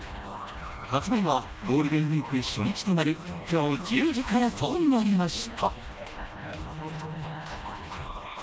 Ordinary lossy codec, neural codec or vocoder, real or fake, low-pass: none; codec, 16 kHz, 1 kbps, FreqCodec, smaller model; fake; none